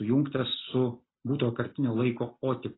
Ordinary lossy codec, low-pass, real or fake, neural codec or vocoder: AAC, 16 kbps; 7.2 kHz; real; none